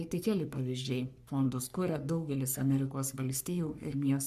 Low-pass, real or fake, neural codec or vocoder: 14.4 kHz; fake; codec, 44.1 kHz, 3.4 kbps, Pupu-Codec